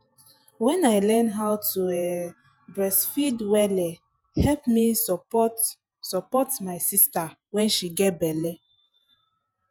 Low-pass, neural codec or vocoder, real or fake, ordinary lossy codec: none; vocoder, 48 kHz, 128 mel bands, Vocos; fake; none